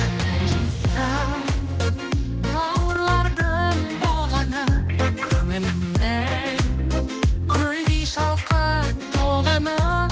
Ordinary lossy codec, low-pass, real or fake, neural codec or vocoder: none; none; fake; codec, 16 kHz, 1 kbps, X-Codec, HuBERT features, trained on general audio